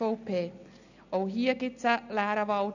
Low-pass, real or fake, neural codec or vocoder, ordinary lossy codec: 7.2 kHz; real; none; none